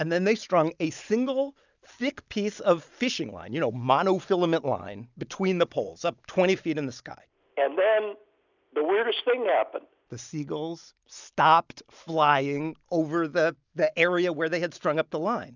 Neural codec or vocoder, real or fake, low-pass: none; real; 7.2 kHz